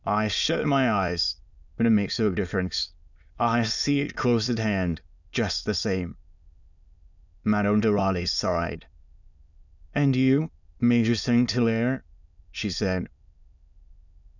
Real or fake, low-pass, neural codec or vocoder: fake; 7.2 kHz; autoencoder, 22.05 kHz, a latent of 192 numbers a frame, VITS, trained on many speakers